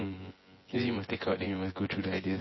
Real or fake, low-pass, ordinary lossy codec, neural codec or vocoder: fake; 7.2 kHz; MP3, 24 kbps; vocoder, 24 kHz, 100 mel bands, Vocos